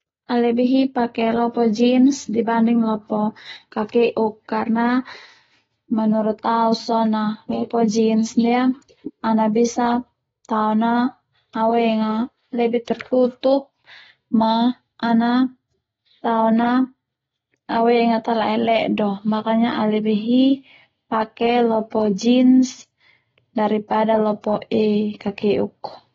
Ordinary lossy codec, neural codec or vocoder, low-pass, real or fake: AAC, 24 kbps; none; 7.2 kHz; real